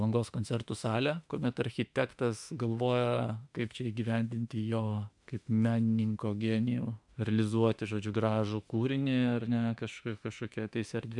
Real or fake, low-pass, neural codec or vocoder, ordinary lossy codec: fake; 10.8 kHz; autoencoder, 48 kHz, 32 numbers a frame, DAC-VAE, trained on Japanese speech; MP3, 96 kbps